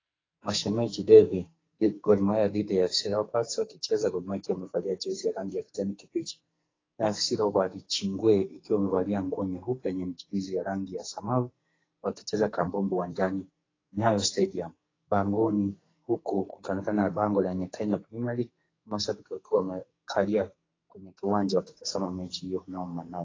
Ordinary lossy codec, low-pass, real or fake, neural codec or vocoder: AAC, 32 kbps; 7.2 kHz; fake; codec, 44.1 kHz, 2.6 kbps, SNAC